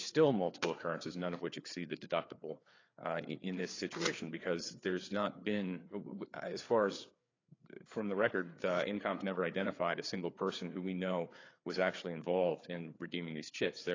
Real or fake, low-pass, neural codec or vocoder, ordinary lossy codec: fake; 7.2 kHz; codec, 16 kHz, 4 kbps, FreqCodec, larger model; AAC, 32 kbps